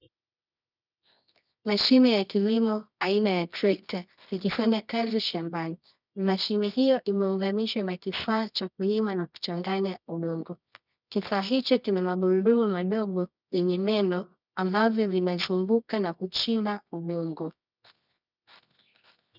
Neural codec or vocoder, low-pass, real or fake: codec, 24 kHz, 0.9 kbps, WavTokenizer, medium music audio release; 5.4 kHz; fake